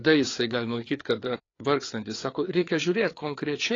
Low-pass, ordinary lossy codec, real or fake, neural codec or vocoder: 7.2 kHz; AAC, 32 kbps; fake; codec, 16 kHz, 4 kbps, FreqCodec, larger model